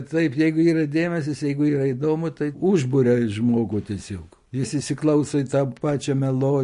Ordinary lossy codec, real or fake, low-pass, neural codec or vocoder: MP3, 48 kbps; real; 10.8 kHz; none